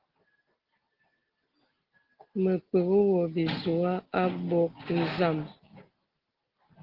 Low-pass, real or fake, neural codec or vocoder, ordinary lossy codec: 5.4 kHz; real; none; Opus, 16 kbps